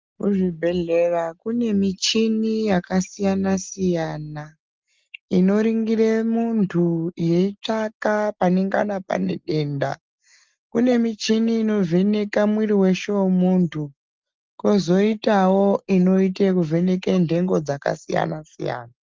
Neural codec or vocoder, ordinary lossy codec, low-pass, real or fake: none; Opus, 24 kbps; 7.2 kHz; real